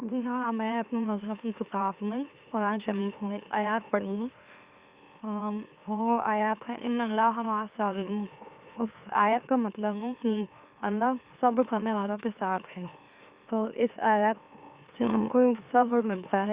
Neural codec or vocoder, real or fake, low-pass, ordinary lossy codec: autoencoder, 44.1 kHz, a latent of 192 numbers a frame, MeloTTS; fake; 3.6 kHz; Opus, 64 kbps